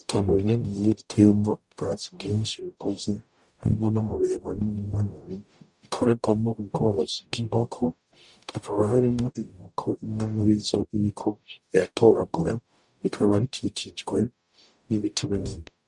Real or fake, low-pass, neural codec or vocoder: fake; 10.8 kHz; codec, 44.1 kHz, 0.9 kbps, DAC